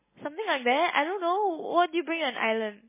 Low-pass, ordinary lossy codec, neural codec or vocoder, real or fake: 3.6 kHz; MP3, 16 kbps; none; real